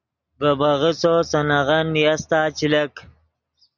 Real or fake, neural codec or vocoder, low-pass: real; none; 7.2 kHz